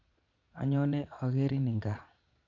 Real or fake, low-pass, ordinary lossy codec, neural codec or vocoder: fake; 7.2 kHz; none; vocoder, 22.05 kHz, 80 mel bands, Vocos